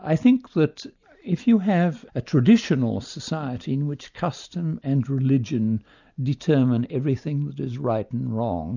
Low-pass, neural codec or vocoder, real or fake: 7.2 kHz; none; real